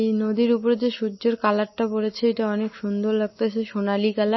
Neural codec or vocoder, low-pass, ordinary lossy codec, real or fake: none; 7.2 kHz; MP3, 24 kbps; real